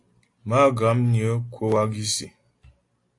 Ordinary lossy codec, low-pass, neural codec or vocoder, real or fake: AAC, 48 kbps; 10.8 kHz; none; real